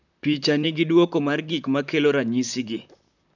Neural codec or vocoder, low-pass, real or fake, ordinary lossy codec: vocoder, 44.1 kHz, 128 mel bands, Pupu-Vocoder; 7.2 kHz; fake; none